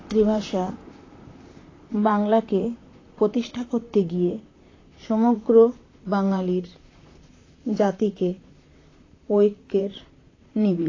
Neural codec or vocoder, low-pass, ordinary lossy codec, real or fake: vocoder, 44.1 kHz, 128 mel bands, Pupu-Vocoder; 7.2 kHz; AAC, 32 kbps; fake